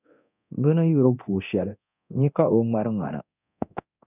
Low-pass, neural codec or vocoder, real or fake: 3.6 kHz; codec, 24 kHz, 0.9 kbps, DualCodec; fake